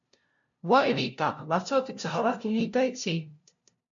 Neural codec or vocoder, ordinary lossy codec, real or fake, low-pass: codec, 16 kHz, 0.5 kbps, FunCodec, trained on LibriTTS, 25 frames a second; MP3, 64 kbps; fake; 7.2 kHz